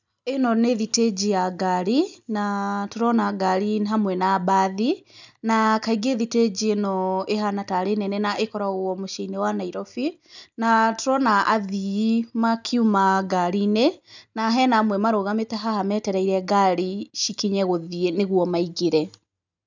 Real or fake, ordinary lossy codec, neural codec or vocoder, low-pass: real; none; none; 7.2 kHz